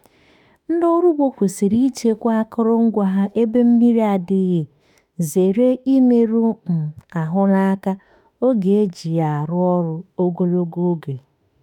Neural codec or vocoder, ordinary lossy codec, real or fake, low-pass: autoencoder, 48 kHz, 32 numbers a frame, DAC-VAE, trained on Japanese speech; none; fake; 19.8 kHz